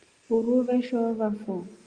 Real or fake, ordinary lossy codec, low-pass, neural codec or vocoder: real; Opus, 32 kbps; 9.9 kHz; none